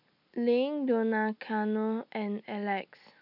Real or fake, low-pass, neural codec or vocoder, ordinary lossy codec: real; 5.4 kHz; none; none